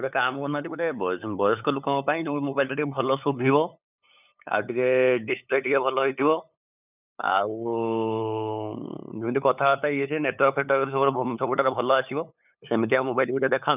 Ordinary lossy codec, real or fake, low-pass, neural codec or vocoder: none; fake; 3.6 kHz; codec, 16 kHz, 8 kbps, FunCodec, trained on LibriTTS, 25 frames a second